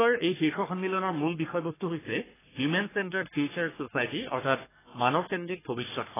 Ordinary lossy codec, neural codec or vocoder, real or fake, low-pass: AAC, 16 kbps; codec, 44.1 kHz, 3.4 kbps, Pupu-Codec; fake; 3.6 kHz